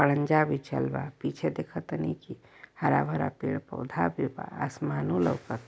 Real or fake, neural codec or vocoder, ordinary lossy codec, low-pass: real; none; none; none